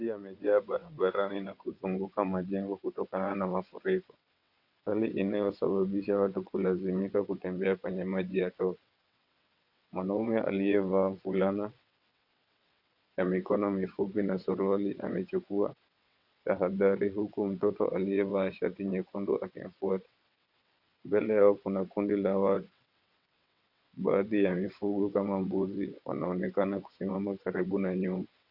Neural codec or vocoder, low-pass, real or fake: vocoder, 22.05 kHz, 80 mel bands, WaveNeXt; 5.4 kHz; fake